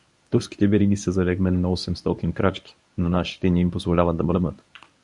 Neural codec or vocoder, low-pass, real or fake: codec, 24 kHz, 0.9 kbps, WavTokenizer, medium speech release version 1; 10.8 kHz; fake